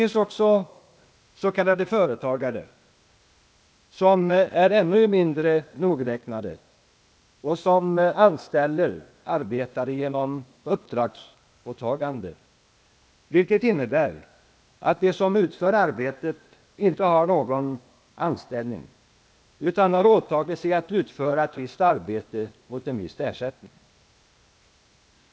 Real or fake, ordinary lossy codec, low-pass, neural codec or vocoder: fake; none; none; codec, 16 kHz, 0.8 kbps, ZipCodec